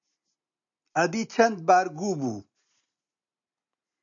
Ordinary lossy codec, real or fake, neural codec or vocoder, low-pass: MP3, 96 kbps; real; none; 7.2 kHz